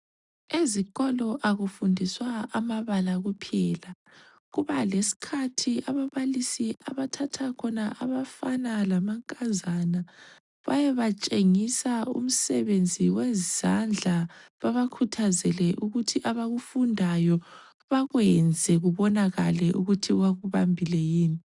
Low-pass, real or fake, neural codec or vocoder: 10.8 kHz; real; none